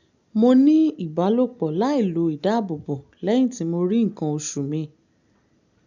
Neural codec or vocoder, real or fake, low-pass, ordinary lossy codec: none; real; 7.2 kHz; none